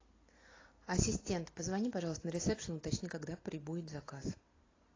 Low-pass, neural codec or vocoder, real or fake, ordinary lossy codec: 7.2 kHz; none; real; AAC, 32 kbps